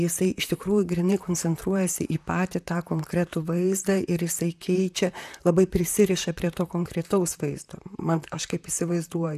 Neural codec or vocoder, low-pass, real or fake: vocoder, 44.1 kHz, 128 mel bands, Pupu-Vocoder; 14.4 kHz; fake